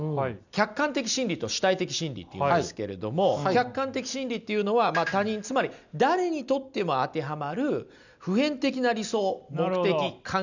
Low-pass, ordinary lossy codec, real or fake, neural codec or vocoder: 7.2 kHz; none; real; none